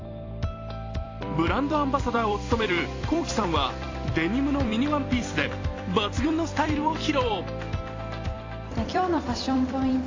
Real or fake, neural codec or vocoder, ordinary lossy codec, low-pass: fake; vocoder, 44.1 kHz, 128 mel bands every 256 samples, BigVGAN v2; AAC, 32 kbps; 7.2 kHz